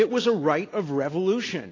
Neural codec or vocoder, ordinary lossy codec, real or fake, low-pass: none; AAC, 32 kbps; real; 7.2 kHz